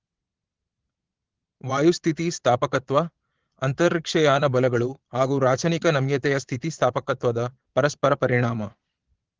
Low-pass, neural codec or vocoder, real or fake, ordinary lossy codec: 7.2 kHz; vocoder, 22.05 kHz, 80 mel bands, Vocos; fake; Opus, 16 kbps